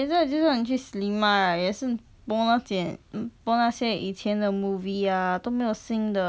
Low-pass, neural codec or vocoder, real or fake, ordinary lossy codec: none; none; real; none